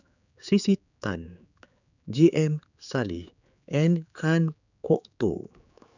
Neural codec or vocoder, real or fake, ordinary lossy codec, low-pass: codec, 16 kHz, 4 kbps, X-Codec, HuBERT features, trained on general audio; fake; none; 7.2 kHz